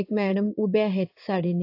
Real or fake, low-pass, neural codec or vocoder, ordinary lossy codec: fake; 5.4 kHz; codec, 16 kHz in and 24 kHz out, 1 kbps, XY-Tokenizer; AAC, 32 kbps